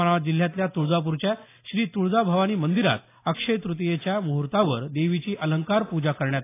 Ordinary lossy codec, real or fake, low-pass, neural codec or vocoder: AAC, 24 kbps; real; 3.6 kHz; none